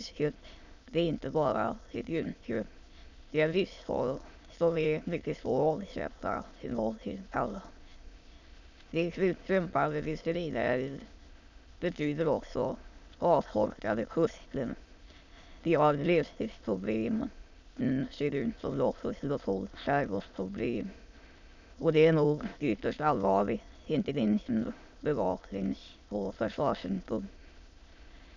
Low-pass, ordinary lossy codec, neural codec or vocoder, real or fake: 7.2 kHz; none; autoencoder, 22.05 kHz, a latent of 192 numbers a frame, VITS, trained on many speakers; fake